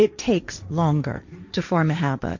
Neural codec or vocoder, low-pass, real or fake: codec, 16 kHz, 1.1 kbps, Voila-Tokenizer; 7.2 kHz; fake